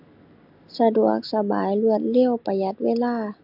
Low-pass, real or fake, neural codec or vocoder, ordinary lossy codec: 5.4 kHz; real; none; AAC, 48 kbps